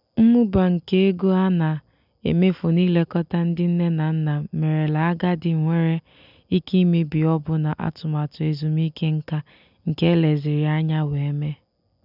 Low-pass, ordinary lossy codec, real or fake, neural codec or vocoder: 5.4 kHz; none; real; none